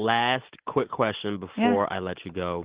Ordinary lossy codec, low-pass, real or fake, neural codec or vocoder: Opus, 16 kbps; 3.6 kHz; real; none